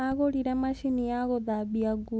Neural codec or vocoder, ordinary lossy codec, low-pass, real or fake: none; none; none; real